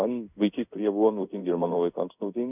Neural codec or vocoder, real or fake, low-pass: codec, 16 kHz in and 24 kHz out, 1 kbps, XY-Tokenizer; fake; 3.6 kHz